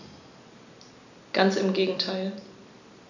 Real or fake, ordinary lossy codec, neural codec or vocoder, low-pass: real; none; none; 7.2 kHz